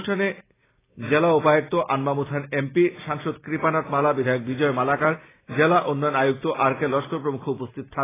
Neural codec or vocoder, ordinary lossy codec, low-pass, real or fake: none; AAC, 16 kbps; 3.6 kHz; real